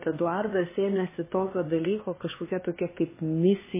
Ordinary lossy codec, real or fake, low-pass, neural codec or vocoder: MP3, 16 kbps; fake; 3.6 kHz; codec, 16 kHz, 2 kbps, X-Codec, HuBERT features, trained on LibriSpeech